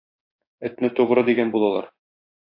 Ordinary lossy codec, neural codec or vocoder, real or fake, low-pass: AAC, 32 kbps; codec, 16 kHz, 6 kbps, DAC; fake; 5.4 kHz